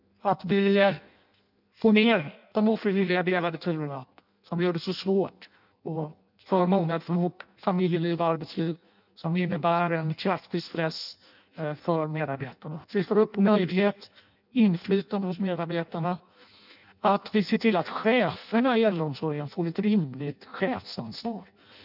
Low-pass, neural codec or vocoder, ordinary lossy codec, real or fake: 5.4 kHz; codec, 16 kHz in and 24 kHz out, 0.6 kbps, FireRedTTS-2 codec; none; fake